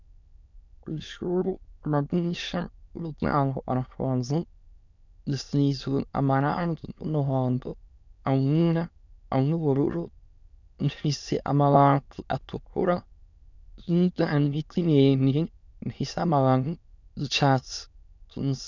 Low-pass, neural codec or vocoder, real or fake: 7.2 kHz; autoencoder, 22.05 kHz, a latent of 192 numbers a frame, VITS, trained on many speakers; fake